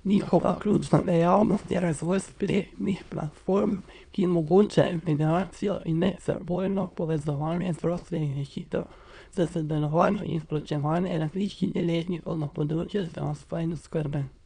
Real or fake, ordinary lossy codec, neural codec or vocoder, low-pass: fake; none; autoencoder, 22.05 kHz, a latent of 192 numbers a frame, VITS, trained on many speakers; 9.9 kHz